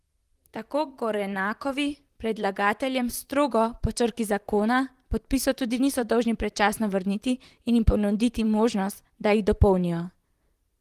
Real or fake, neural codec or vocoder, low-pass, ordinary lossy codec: fake; vocoder, 44.1 kHz, 128 mel bands, Pupu-Vocoder; 14.4 kHz; Opus, 24 kbps